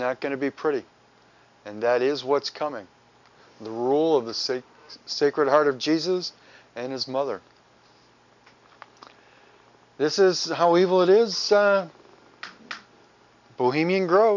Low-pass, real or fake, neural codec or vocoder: 7.2 kHz; real; none